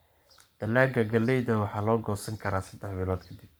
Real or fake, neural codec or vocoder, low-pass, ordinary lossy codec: fake; codec, 44.1 kHz, 7.8 kbps, Pupu-Codec; none; none